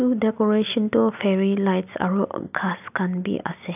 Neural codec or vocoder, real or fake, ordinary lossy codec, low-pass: none; real; none; 3.6 kHz